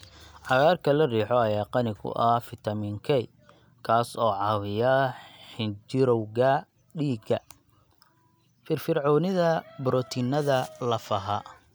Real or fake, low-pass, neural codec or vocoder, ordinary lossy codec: real; none; none; none